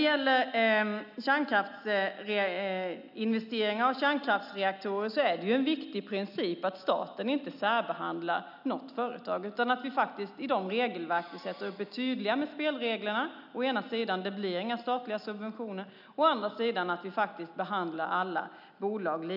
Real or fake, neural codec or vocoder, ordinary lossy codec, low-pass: real; none; none; 5.4 kHz